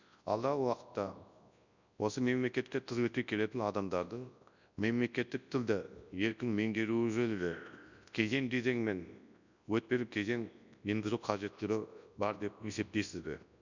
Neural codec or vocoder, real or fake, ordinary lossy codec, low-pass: codec, 24 kHz, 0.9 kbps, WavTokenizer, large speech release; fake; none; 7.2 kHz